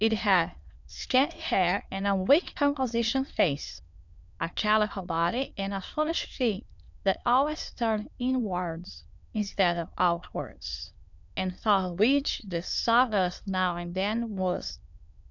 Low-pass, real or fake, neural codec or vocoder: 7.2 kHz; fake; autoencoder, 22.05 kHz, a latent of 192 numbers a frame, VITS, trained on many speakers